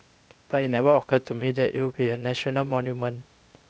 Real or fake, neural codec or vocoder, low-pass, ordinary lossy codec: fake; codec, 16 kHz, 0.8 kbps, ZipCodec; none; none